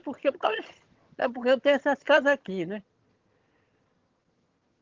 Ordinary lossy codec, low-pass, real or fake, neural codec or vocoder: Opus, 16 kbps; 7.2 kHz; fake; vocoder, 22.05 kHz, 80 mel bands, HiFi-GAN